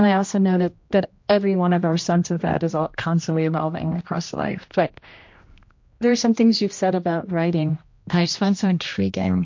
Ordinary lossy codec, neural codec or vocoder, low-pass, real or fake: MP3, 48 kbps; codec, 16 kHz, 1 kbps, X-Codec, HuBERT features, trained on general audio; 7.2 kHz; fake